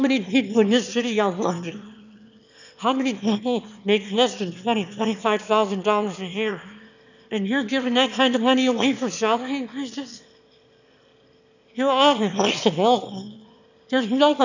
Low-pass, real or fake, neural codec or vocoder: 7.2 kHz; fake; autoencoder, 22.05 kHz, a latent of 192 numbers a frame, VITS, trained on one speaker